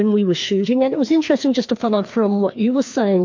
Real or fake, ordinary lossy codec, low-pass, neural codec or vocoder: fake; MP3, 48 kbps; 7.2 kHz; codec, 16 kHz, 2 kbps, FreqCodec, larger model